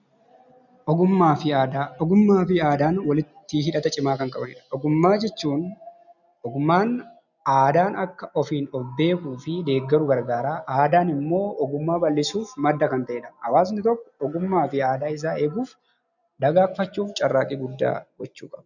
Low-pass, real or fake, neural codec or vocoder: 7.2 kHz; real; none